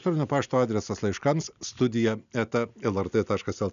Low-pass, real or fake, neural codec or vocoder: 7.2 kHz; real; none